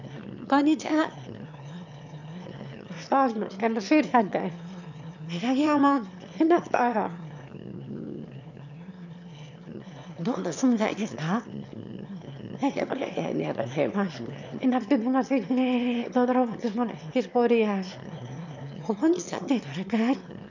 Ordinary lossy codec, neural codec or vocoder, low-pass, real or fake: none; autoencoder, 22.05 kHz, a latent of 192 numbers a frame, VITS, trained on one speaker; 7.2 kHz; fake